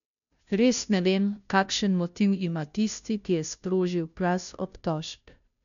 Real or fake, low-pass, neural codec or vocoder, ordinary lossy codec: fake; 7.2 kHz; codec, 16 kHz, 0.5 kbps, FunCodec, trained on Chinese and English, 25 frames a second; MP3, 96 kbps